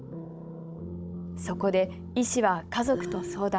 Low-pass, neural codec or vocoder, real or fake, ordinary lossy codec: none; codec, 16 kHz, 16 kbps, FunCodec, trained on Chinese and English, 50 frames a second; fake; none